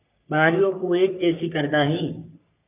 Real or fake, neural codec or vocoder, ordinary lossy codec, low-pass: fake; codec, 44.1 kHz, 3.4 kbps, Pupu-Codec; AAC, 32 kbps; 3.6 kHz